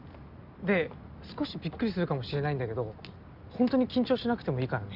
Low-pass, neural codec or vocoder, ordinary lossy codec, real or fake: 5.4 kHz; none; Opus, 64 kbps; real